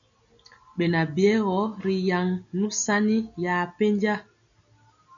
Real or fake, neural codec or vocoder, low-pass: real; none; 7.2 kHz